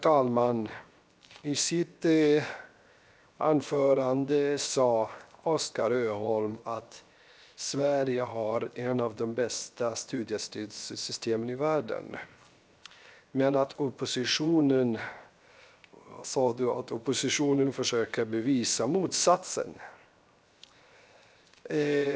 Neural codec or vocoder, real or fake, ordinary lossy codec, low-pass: codec, 16 kHz, 0.7 kbps, FocalCodec; fake; none; none